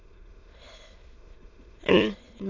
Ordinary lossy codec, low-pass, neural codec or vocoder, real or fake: MP3, 48 kbps; 7.2 kHz; autoencoder, 22.05 kHz, a latent of 192 numbers a frame, VITS, trained on many speakers; fake